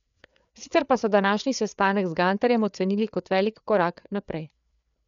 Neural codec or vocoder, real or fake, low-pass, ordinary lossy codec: codec, 16 kHz, 4 kbps, FreqCodec, larger model; fake; 7.2 kHz; none